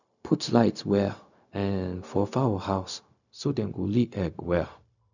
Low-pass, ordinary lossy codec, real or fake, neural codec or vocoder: 7.2 kHz; none; fake; codec, 16 kHz, 0.4 kbps, LongCat-Audio-Codec